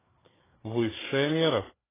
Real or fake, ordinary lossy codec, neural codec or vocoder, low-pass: fake; AAC, 16 kbps; codec, 16 kHz, 4 kbps, FreqCodec, larger model; 3.6 kHz